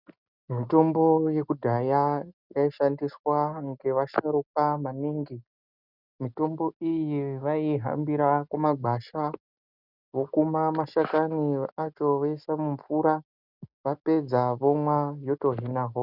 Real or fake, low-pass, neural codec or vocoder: real; 5.4 kHz; none